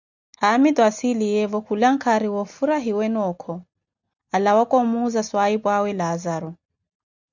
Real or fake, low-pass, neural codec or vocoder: real; 7.2 kHz; none